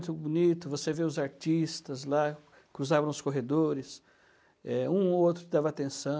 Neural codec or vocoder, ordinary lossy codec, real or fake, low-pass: none; none; real; none